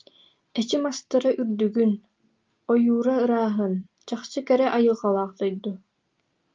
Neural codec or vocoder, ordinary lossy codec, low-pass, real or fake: none; Opus, 24 kbps; 7.2 kHz; real